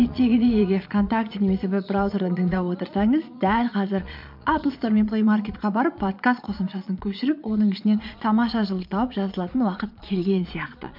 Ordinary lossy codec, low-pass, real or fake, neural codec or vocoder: MP3, 48 kbps; 5.4 kHz; fake; vocoder, 22.05 kHz, 80 mel bands, Vocos